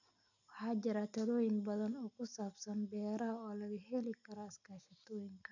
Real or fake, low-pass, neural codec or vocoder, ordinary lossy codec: real; 7.2 kHz; none; none